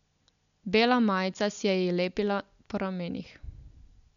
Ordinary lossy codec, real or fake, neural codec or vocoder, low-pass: none; real; none; 7.2 kHz